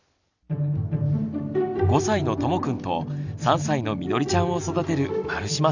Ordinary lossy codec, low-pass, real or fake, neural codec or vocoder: none; 7.2 kHz; real; none